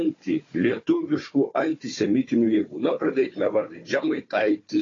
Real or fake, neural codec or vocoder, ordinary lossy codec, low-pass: fake; codec, 16 kHz, 4 kbps, FunCodec, trained on Chinese and English, 50 frames a second; AAC, 32 kbps; 7.2 kHz